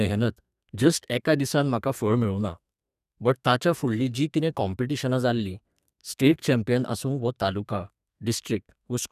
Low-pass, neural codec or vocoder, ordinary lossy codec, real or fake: 14.4 kHz; codec, 32 kHz, 1.9 kbps, SNAC; none; fake